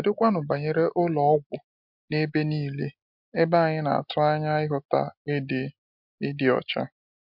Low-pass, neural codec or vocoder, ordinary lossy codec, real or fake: 5.4 kHz; none; MP3, 48 kbps; real